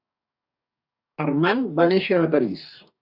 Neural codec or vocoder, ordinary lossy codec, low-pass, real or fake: codec, 44.1 kHz, 2.6 kbps, DAC; AAC, 48 kbps; 5.4 kHz; fake